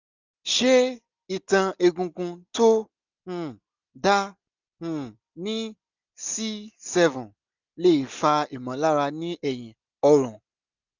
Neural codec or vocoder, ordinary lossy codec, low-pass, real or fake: none; none; 7.2 kHz; real